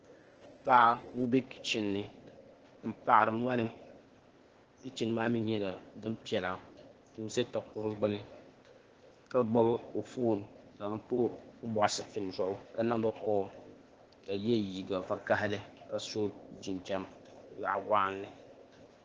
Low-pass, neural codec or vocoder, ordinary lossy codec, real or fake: 7.2 kHz; codec, 16 kHz, 0.8 kbps, ZipCodec; Opus, 16 kbps; fake